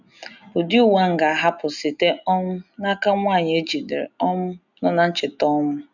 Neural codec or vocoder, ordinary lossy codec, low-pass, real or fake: none; none; 7.2 kHz; real